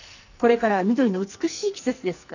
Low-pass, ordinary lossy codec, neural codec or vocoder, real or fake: 7.2 kHz; none; codec, 44.1 kHz, 2.6 kbps, SNAC; fake